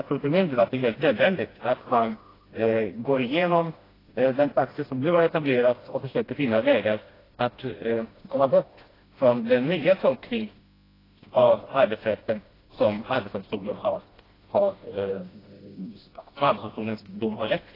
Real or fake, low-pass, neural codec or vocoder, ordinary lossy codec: fake; 5.4 kHz; codec, 16 kHz, 1 kbps, FreqCodec, smaller model; AAC, 24 kbps